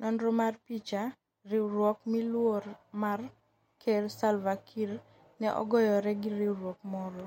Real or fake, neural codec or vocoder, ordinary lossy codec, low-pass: real; none; MP3, 64 kbps; 19.8 kHz